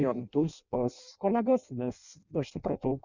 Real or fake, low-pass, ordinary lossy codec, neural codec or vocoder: fake; 7.2 kHz; Opus, 64 kbps; codec, 16 kHz in and 24 kHz out, 0.6 kbps, FireRedTTS-2 codec